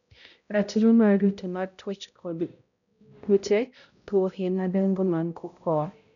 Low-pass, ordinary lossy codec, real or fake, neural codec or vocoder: 7.2 kHz; none; fake; codec, 16 kHz, 0.5 kbps, X-Codec, HuBERT features, trained on balanced general audio